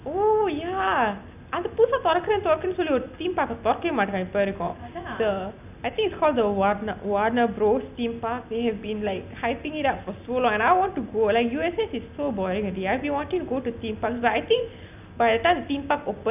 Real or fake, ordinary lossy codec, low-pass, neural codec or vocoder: real; none; 3.6 kHz; none